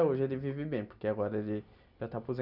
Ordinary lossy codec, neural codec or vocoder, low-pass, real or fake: none; none; 5.4 kHz; real